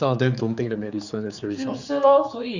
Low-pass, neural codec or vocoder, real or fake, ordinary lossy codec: 7.2 kHz; codec, 16 kHz, 4 kbps, X-Codec, HuBERT features, trained on general audio; fake; Opus, 64 kbps